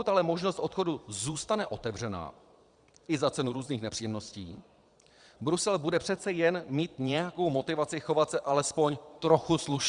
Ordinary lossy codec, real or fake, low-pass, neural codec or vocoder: Opus, 64 kbps; fake; 9.9 kHz; vocoder, 22.05 kHz, 80 mel bands, Vocos